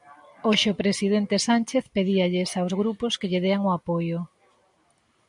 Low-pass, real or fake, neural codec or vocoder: 10.8 kHz; real; none